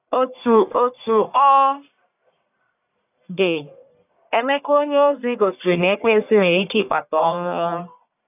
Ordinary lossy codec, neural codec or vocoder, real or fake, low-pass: none; codec, 44.1 kHz, 1.7 kbps, Pupu-Codec; fake; 3.6 kHz